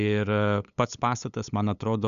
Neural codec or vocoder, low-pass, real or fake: codec, 16 kHz, 8 kbps, FunCodec, trained on LibriTTS, 25 frames a second; 7.2 kHz; fake